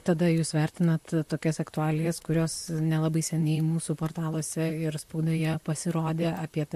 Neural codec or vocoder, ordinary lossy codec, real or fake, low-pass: vocoder, 44.1 kHz, 128 mel bands, Pupu-Vocoder; MP3, 64 kbps; fake; 14.4 kHz